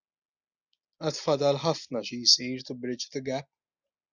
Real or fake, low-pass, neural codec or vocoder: real; 7.2 kHz; none